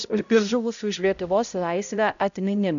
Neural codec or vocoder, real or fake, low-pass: codec, 16 kHz, 0.5 kbps, X-Codec, HuBERT features, trained on balanced general audio; fake; 7.2 kHz